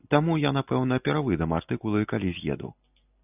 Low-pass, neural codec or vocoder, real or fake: 3.6 kHz; none; real